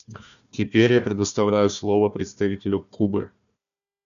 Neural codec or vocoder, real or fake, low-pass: codec, 16 kHz, 1 kbps, FunCodec, trained on Chinese and English, 50 frames a second; fake; 7.2 kHz